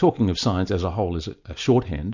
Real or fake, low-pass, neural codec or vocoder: real; 7.2 kHz; none